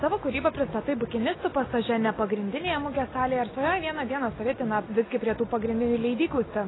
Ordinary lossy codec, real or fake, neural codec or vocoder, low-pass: AAC, 16 kbps; real; none; 7.2 kHz